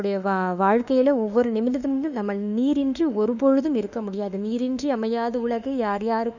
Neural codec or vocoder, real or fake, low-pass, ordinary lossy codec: autoencoder, 48 kHz, 32 numbers a frame, DAC-VAE, trained on Japanese speech; fake; 7.2 kHz; none